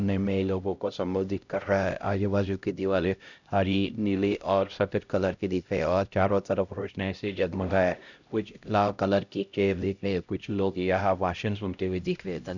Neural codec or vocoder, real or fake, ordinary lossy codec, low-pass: codec, 16 kHz, 0.5 kbps, X-Codec, HuBERT features, trained on LibriSpeech; fake; none; 7.2 kHz